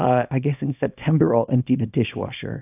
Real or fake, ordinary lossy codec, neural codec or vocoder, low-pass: fake; AAC, 32 kbps; codec, 24 kHz, 0.9 kbps, WavTokenizer, small release; 3.6 kHz